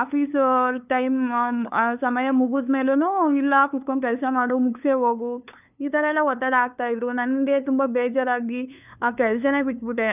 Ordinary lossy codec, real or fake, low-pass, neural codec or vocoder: none; fake; 3.6 kHz; codec, 16 kHz, 2 kbps, FunCodec, trained on LibriTTS, 25 frames a second